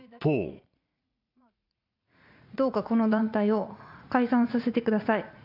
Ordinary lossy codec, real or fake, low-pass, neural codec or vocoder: AAC, 48 kbps; fake; 5.4 kHz; vocoder, 44.1 kHz, 80 mel bands, Vocos